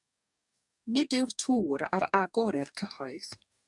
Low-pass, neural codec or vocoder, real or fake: 10.8 kHz; codec, 44.1 kHz, 2.6 kbps, DAC; fake